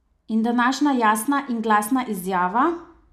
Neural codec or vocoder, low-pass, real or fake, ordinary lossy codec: none; 14.4 kHz; real; none